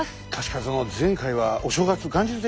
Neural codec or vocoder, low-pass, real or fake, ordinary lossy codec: none; none; real; none